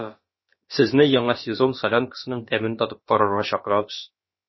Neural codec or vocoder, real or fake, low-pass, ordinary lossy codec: codec, 16 kHz, about 1 kbps, DyCAST, with the encoder's durations; fake; 7.2 kHz; MP3, 24 kbps